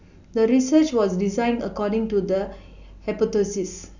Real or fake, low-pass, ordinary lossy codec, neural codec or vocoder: real; 7.2 kHz; none; none